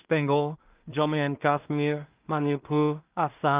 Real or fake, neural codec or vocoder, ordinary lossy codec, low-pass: fake; codec, 16 kHz in and 24 kHz out, 0.4 kbps, LongCat-Audio-Codec, two codebook decoder; Opus, 32 kbps; 3.6 kHz